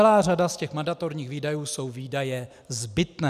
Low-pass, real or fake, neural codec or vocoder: 14.4 kHz; real; none